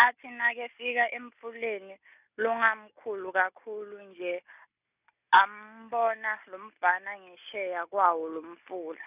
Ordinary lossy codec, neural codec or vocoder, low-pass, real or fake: none; none; 3.6 kHz; real